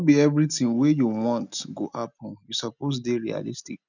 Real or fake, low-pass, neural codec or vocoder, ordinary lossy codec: real; 7.2 kHz; none; none